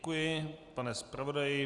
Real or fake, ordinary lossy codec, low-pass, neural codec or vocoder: real; Opus, 64 kbps; 10.8 kHz; none